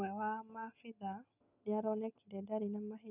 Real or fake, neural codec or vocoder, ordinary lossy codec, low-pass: real; none; none; 3.6 kHz